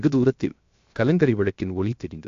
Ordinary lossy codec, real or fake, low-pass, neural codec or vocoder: AAC, 64 kbps; fake; 7.2 kHz; codec, 16 kHz, 0.7 kbps, FocalCodec